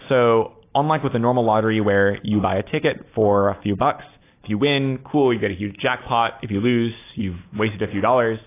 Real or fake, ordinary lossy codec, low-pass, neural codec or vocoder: real; AAC, 24 kbps; 3.6 kHz; none